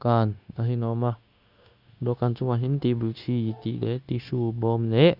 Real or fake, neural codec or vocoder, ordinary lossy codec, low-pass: fake; codec, 16 kHz, 0.9 kbps, LongCat-Audio-Codec; Opus, 64 kbps; 5.4 kHz